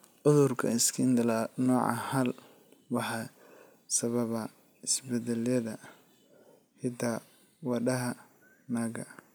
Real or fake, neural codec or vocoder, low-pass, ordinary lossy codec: real; none; none; none